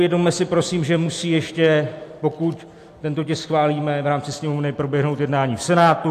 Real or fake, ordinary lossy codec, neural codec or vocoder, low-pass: real; AAC, 64 kbps; none; 14.4 kHz